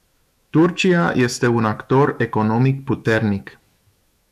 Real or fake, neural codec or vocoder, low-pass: fake; autoencoder, 48 kHz, 128 numbers a frame, DAC-VAE, trained on Japanese speech; 14.4 kHz